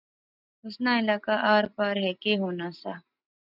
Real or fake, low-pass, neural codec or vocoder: real; 5.4 kHz; none